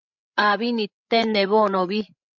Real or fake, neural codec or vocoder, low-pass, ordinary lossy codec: fake; codec, 16 kHz, 16 kbps, FreqCodec, larger model; 7.2 kHz; MP3, 64 kbps